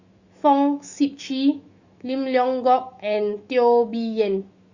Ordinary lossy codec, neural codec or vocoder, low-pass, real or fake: Opus, 64 kbps; autoencoder, 48 kHz, 128 numbers a frame, DAC-VAE, trained on Japanese speech; 7.2 kHz; fake